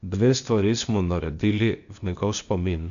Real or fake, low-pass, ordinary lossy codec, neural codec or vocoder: fake; 7.2 kHz; none; codec, 16 kHz, 0.8 kbps, ZipCodec